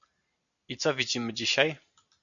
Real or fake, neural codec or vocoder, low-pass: real; none; 7.2 kHz